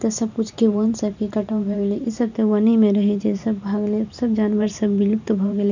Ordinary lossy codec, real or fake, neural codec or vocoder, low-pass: none; fake; vocoder, 44.1 kHz, 128 mel bands every 512 samples, BigVGAN v2; 7.2 kHz